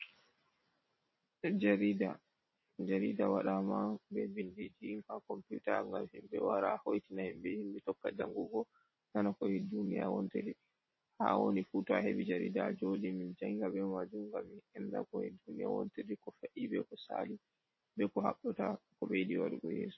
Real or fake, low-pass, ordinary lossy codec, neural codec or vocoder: real; 7.2 kHz; MP3, 24 kbps; none